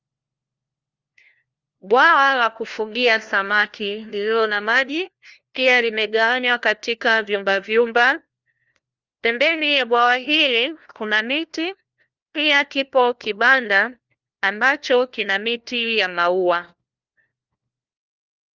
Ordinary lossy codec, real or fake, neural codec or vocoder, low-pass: Opus, 32 kbps; fake; codec, 16 kHz, 1 kbps, FunCodec, trained on LibriTTS, 50 frames a second; 7.2 kHz